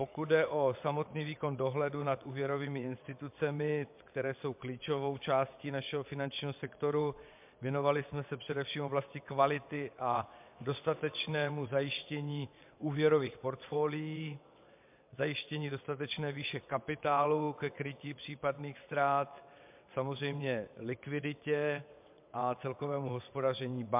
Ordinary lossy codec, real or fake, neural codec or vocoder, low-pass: MP3, 32 kbps; fake; vocoder, 22.05 kHz, 80 mel bands, WaveNeXt; 3.6 kHz